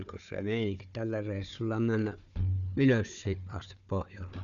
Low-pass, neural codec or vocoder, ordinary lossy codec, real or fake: 7.2 kHz; codec, 16 kHz, 4 kbps, FunCodec, trained on Chinese and English, 50 frames a second; none; fake